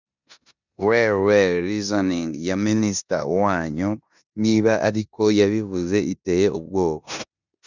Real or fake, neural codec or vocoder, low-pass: fake; codec, 16 kHz in and 24 kHz out, 0.9 kbps, LongCat-Audio-Codec, four codebook decoder; 7.2 kHz